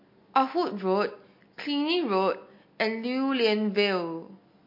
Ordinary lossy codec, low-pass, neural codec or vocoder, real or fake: MP3, 32 kbps; 5.4 kHz; none; real